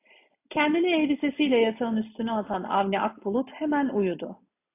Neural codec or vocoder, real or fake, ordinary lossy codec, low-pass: none; real; AAC, 24 kbps; 3.6 kHz